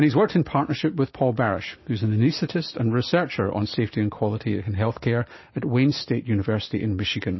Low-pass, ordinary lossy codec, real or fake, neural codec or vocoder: 7.2 kHz; MP3, 24 kbps; real; none